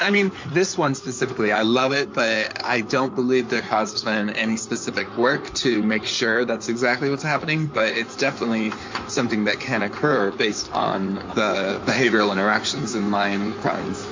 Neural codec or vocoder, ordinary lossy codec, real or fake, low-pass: codec, 16 kHz in and 24 kHz out, 2.2 kbps, FireRedTTS-2 codec; MP3, 48 kbps; fake; 7.2 kHz